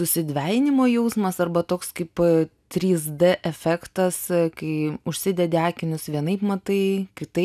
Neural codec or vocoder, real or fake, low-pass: none; real; 14.4 kHz